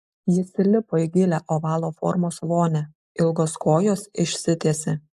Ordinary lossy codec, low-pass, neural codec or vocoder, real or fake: AAC, 96 kbps; 14.4 kHz; none; real